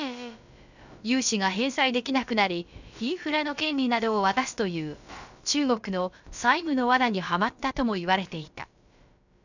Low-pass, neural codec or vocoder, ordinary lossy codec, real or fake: 7.2 kHz; codec, 16 kHz, about 1 kbps, DyCAST, with the encoder's durations; none; fake